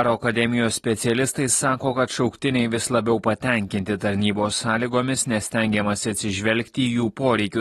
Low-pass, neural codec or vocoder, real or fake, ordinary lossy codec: 19.8 kHz; none; real; AAC, 32 kbps